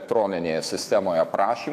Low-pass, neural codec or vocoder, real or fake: 14.4 kHz; autoencoder, 48 kHz, 128 numbers a frame, DAC-VAE, trained on Japanese speech; fake